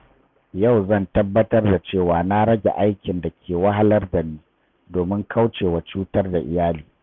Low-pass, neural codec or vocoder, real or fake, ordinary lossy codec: none; none; real; none